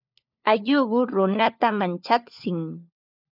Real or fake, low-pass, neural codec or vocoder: fake; 5.4 kHz; codec, 16 kHz, 4 kbps, FunCodec, trained on LibriTTS, 50 frames a second